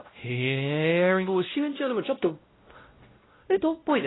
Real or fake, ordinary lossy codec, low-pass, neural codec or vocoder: fake; AAC, 16 kbps; 7.2 kHz; codec, 16 kHz, 0.5 kbps, X-Codec, WavLM features, trained on Multilingual LibriSpeech